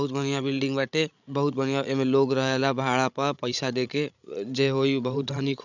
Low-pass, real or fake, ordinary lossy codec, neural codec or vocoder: 7.2 kHz; fake; none; codec, 16 kHz, 16 kbps, FreqCodec, larger model